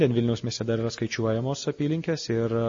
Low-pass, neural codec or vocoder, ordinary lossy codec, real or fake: 7.2 kHz; none; MP3, 32 kbps; real